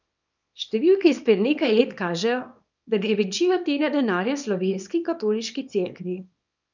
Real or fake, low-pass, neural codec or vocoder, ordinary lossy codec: fake; 7.2 kHz; codec, 24 kHz, 0.9 kbps, WavTokenizer, small release; none